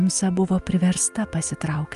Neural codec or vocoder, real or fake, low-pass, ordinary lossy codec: none; real; 10.8 kHz; Opus, 32 kbps